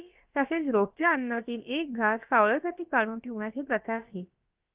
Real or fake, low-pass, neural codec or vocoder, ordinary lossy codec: fake; 3.6 kHz; codec, 16 kHz, about 1 kbps, DyCAST, with the encoder's durations; Opus, 32 kbps